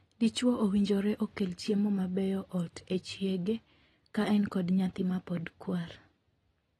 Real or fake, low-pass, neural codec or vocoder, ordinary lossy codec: real; 19.8 kHz; none; AAC, 32 kbps